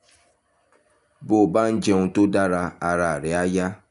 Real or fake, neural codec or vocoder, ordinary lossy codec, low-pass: real; none; none; 10.8 kHz